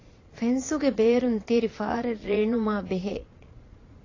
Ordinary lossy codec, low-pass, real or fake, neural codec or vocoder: AAC, 32 kbps; 7.2 kHz; fake; vocoder, 22.05 kHz, 80 mel bands, Vocos